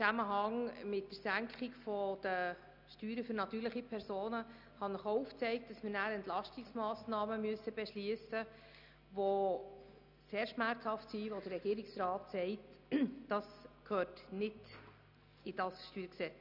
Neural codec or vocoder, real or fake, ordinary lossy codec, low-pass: none; real; none; 5.4 kHz